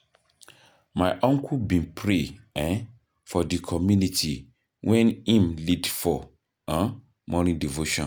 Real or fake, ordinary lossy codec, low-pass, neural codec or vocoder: real; none; none; none